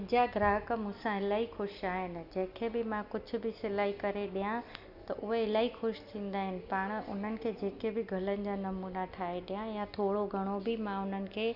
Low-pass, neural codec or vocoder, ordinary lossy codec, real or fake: 5.4 kHz; none; Opus, 64 kbps; real